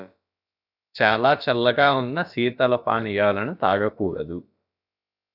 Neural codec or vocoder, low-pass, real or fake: codec, 16 kHz, about 1 kbps, DyCAST, with the encoder's durations; 5.4 kHz; fake